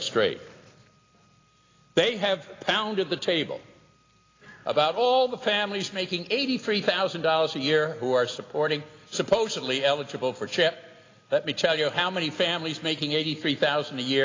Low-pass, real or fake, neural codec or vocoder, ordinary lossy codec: 7.2 kHz; real; none; AAC, 32 kbps